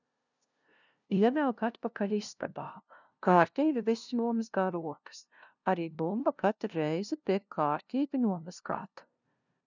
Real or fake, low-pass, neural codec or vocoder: fake; 7.2 kHz; codec, 16 kHz, 0.5 kbps, FunCodec, trained on LibriTTS, 25 frames a second